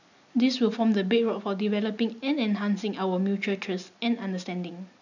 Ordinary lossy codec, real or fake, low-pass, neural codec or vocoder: none; real; 7.2 kHz; none